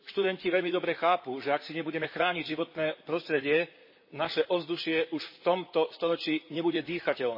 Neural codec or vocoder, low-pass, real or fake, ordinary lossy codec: vocoder, 44.1 kHz, 128 mel bands, Pupu-Vocoder; 5.4 kHz; fake; MP3, 24 kbps